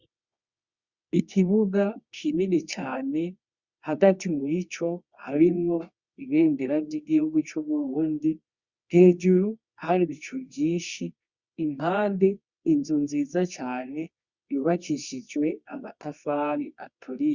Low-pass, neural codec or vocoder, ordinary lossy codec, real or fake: 7.2 kHz; codec, 24 kHz, 0.9 kbps, WavTokenizer, medium music audio release; Opus, 64 kbps; fake